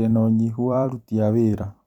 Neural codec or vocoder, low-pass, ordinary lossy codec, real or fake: vocoder, 44.1 kHz, 128 mel bands every 256 samples, BigVGAN v2; 19.8 kHz; none; fake